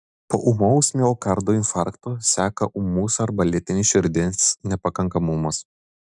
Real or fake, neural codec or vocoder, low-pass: real; none; 10.8 kHz